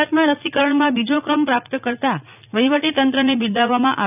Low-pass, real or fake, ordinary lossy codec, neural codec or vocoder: 3.6 kHz; fake; none; vocoder, 44.1 kHz, 80 mel bands, Vocos